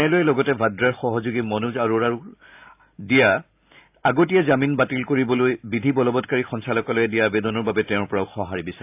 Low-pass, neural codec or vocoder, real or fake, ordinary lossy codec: 3.6 kHz; none; real; AAC, 32 kbps